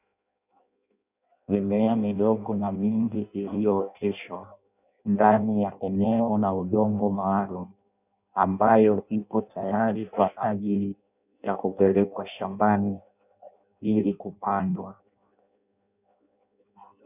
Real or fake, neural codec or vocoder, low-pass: fake; codec, 16 kHz in and 24 kHz out, 0.6 kbps, FireRedTTS-2 codec; 3.6 kHz